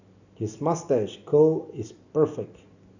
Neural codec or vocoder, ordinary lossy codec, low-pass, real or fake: none; none; 7.2 kHz; real